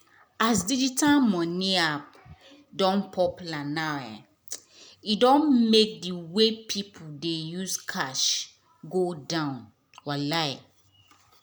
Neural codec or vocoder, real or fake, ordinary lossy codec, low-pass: none; real; none; none